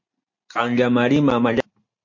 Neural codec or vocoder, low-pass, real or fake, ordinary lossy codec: none; 7.2 kHz; real; MP3, 48 kbps